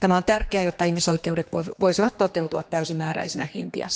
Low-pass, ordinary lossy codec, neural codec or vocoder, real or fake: none; none; codec, 16 kHz, 2 kbps, X-Codec, HuBERT features, trained on general audio; fake